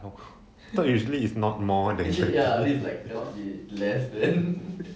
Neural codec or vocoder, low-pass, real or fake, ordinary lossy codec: none; none; real; none